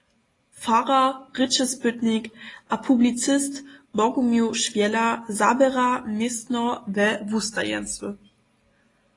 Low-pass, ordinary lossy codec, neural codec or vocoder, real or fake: 10.8 kHz; AAC, 32 kbps; none; real